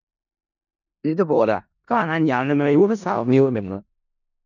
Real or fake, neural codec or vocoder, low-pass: fake; codec, 16 kHz in and 24 kHz out, 0.4 kbps, LongCat-Audio-Codec, four codebook decoder; 7.2 kHz